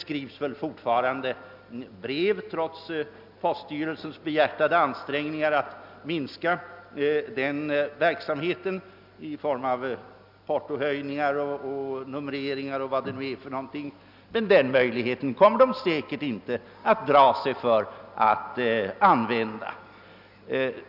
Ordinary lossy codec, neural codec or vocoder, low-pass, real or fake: none; none; 5.4 kHz; real